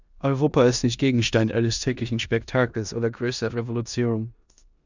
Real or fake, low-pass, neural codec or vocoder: fake; 7.2 kHz; codec, 16 kHz in and 24 kHz out, 0.9 kbps, LongCat-Audio-Codec, four codebook decoder